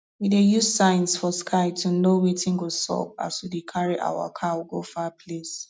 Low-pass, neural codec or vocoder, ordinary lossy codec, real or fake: none; none; none; real